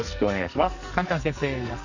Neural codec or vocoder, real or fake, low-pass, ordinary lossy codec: codec, 44.1 kHz, 2.6 kbps, SNAC; fake; 7.2 kHz; none